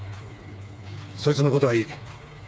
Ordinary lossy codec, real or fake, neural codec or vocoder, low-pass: none; fake; codec, 16 kHz, 4 kbps, FreqCodec, smaller model; none